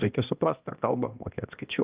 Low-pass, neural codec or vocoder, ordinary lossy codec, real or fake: 3.6 kHz; codec, 16 kHz, 1 kbps, X-Codec, HuBERT features, trained on balanced general audio; Opus, 24 kbps; fake